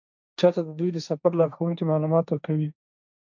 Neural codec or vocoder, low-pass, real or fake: codec, 16 kHz, 1.1 kbps, Voila-Tokenizer; 7.2 kHz; fake